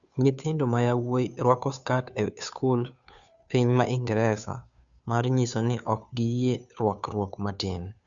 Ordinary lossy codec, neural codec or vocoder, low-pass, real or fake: Opus, 64 kbps; codec, 16 kHz, 2 kbps, FunCodec, trained on Chinese and English, 25 frames a second; 7.2 kHz; fake